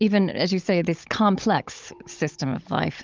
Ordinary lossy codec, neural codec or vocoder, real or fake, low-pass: Opus, 24 kbps; codec, 16 kHz, 16 kbps, FunCodec, trained on Chinese and English, 50 frames a second; fake; 7.2 kHz